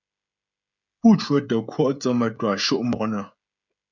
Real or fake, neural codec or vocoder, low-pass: fake; codec, 16 kHz, 16 kbps, FreqCodec, smaller model; 7.2 kHz